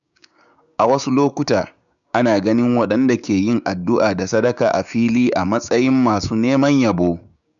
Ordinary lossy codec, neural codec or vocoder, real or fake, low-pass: none; codec, 16 kHz, 6 kbps, DAC; fake; 7.2 kHz